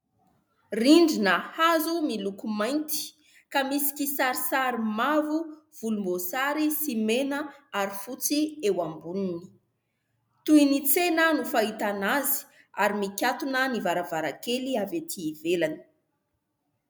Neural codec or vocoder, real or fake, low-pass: none; real; 19.8 kHz